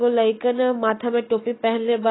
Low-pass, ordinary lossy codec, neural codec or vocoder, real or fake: 7.2 kHz; AAC, 16 kbps; none; real